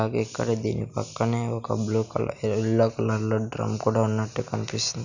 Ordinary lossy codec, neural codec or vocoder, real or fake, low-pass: none; none; real; 7.2 kHz